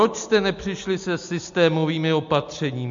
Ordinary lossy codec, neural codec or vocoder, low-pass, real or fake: MP3, 48 kbps; none; 7.2 kHz; real